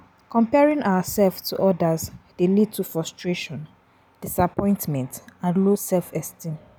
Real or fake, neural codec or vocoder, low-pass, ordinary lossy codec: real; none; none; none